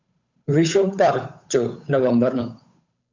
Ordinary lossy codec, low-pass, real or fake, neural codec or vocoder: MP3, 64 kbps; 7.2 kHz; fake; codec, 16 kHz, 8 kbps, FunCodec, trained on Chinese and English, 25 frames a second